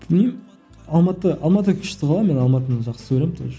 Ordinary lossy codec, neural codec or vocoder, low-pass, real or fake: none; none; none; real